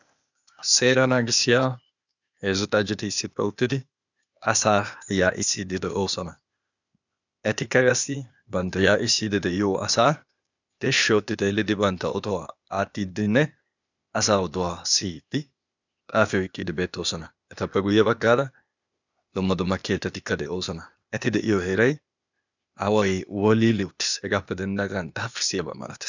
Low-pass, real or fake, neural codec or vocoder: 7.2 kHz; fake; codec, 16 kHz, 0.8 kbps, ZipCodec